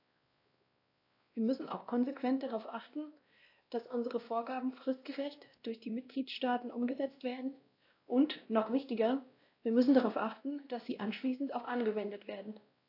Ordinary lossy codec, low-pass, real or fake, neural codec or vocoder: AAC, 32 kbps; 5.4 kHz; fake; codec, 16 kHz, 1 kbps, X-Codec, WavLM features, trained on Multilingual LibriSpeech